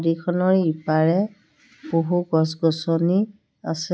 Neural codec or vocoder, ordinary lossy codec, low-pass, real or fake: none; none; none; real